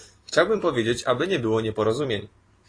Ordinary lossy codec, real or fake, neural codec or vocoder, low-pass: AAC, 32 kbps; fake; vocoder, 44.1 kHz, 128 mel bands every 256 samples, BigVGAN v2; 9.9 kHz